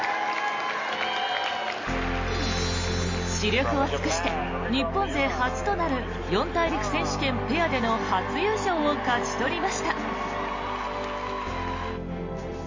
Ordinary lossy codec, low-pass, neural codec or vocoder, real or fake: MP3, 32 kbps; 7.2 kHz; none; real